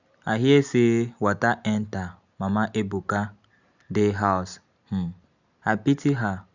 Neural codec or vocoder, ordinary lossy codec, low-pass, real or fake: none; none; 7.2 kHz; real